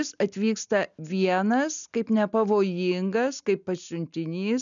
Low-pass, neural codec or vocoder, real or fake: 7.2 kHz; none; real